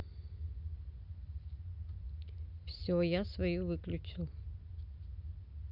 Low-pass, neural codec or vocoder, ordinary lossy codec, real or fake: 5.4 kHz; none; Opus, 64 kbps; real